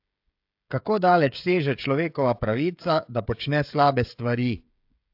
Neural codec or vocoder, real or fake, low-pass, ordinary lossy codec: codec, 16 kHz, 16 kbps, FreqCodec, smaller model; fake; 5.4 kHz; AAC, 48 kbps